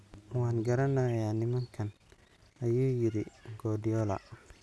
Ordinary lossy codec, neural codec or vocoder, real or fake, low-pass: none; none; real; none